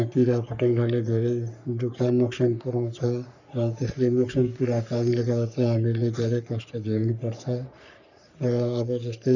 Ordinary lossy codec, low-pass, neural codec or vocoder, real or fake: none; 7.2 kHz; codec, 44.1 kHz, 3.4 kbps, Pupu-Codec; fake